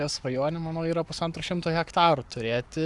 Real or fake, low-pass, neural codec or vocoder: fake; 10.8 kHz; codec, 44.1 kHz, 7.8 kbps, Pupu-Codec